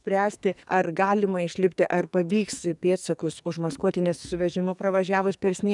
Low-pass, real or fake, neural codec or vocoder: 10.8 kHz; fake; codec, 44.1 kHz, 2.6 kbps, SNAC